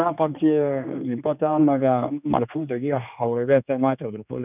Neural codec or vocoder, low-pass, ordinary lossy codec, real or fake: codec, 16 kHz, 2 kbps, X-Codec, HuBERT features, trained on general audio; 3.6 kHz; none; fake